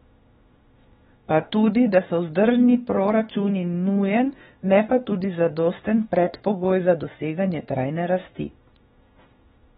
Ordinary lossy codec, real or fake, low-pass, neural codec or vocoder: AAC, 16 kbps; fake; 19.8 kHz; autoencoder, 48 kHz, 32 numbers a frame, DAC-VAE, trained on Japanese speech